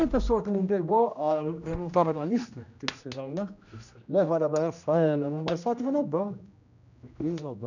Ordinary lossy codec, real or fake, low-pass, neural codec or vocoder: none; fake; 7.2 kHz; codec, 16 kHz, 1 kbps, X-Codec, HuBERT features, trained on general audio